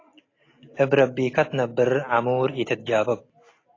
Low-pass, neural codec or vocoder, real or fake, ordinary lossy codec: 7.2 kHz; none; real; AAC, 32 kbps